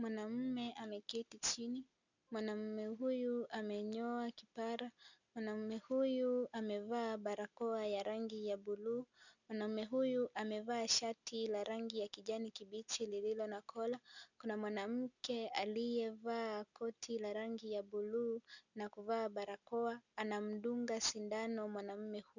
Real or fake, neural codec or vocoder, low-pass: real; none; 7.2 kHz